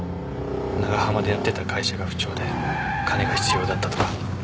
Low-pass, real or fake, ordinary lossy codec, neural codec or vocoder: none; real; none; none